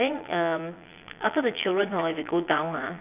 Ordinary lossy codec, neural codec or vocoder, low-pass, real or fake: none; vocoder, 22.05 kHz, 80 mel bands, Vocos; 3.6 kHz; fake